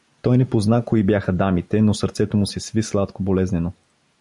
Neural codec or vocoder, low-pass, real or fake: none; 10.8 kHz; real